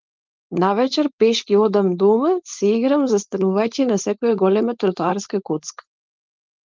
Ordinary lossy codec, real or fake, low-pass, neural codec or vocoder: Opus, 32 kbps; fake; 7.2 kHz; codec, 16 kHz, 4.8 kbps, FACodec